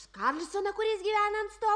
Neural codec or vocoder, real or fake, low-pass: none; real; 9.9 kHz